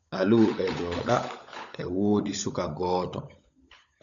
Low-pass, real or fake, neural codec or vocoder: 7.2 kHz; fake; codec, 16 kHz, 16 kbps, FunCodec, trained on LibriTTS, 50 frames a second